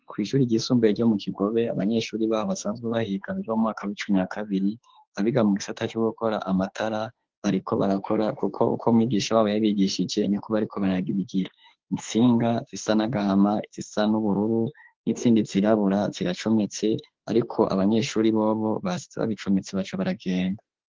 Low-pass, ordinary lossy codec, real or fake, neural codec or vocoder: 7.2 kHz; Opus, 16 kbps; fake; autoencoder, 48 kHz, 32 numbers a frame, DAC-VAE, trained on Japanese speech